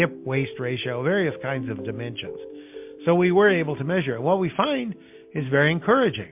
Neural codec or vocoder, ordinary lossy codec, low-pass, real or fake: none; MP3, 32 kbps; 3.6 kHz; real